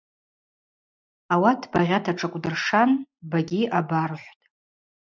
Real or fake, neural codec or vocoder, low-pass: real; none; 7.2 kHz